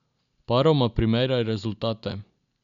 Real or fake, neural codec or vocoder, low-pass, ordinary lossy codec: real; none; 7.2 kHz; MP3, 96 kbps